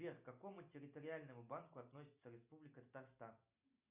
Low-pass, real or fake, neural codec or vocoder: 3.6 kHz; real; none